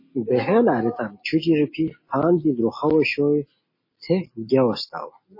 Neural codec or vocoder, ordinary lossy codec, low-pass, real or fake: none; MP3, 24 kbps; 5.4 kHz; real